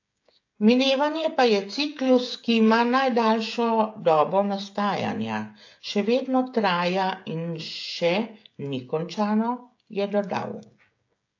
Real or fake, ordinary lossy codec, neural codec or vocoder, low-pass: fake; AAC, 48 kbps; codec, 16 kHz, 16 kbps, FreqCodec, smaller model; 7.2 kHz